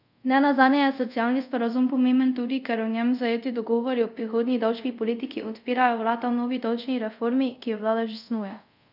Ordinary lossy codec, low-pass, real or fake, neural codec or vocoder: none; 5.4 kHz; fake; codec, 24 kHz, 0.5 kbps, DualCodec